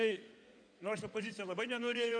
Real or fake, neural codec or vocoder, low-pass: fake; codec, 16 kHz in and 24 kHz out, 2.2 kbps, FireRedTTS-2 codec; 9.9 kHz